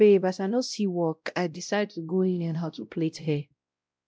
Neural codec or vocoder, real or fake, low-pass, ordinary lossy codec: codec, 16 kHz, 0.5 kbps, X-Codec, WavLM features, trained on Multilingual LibriSpeech; fake; none; none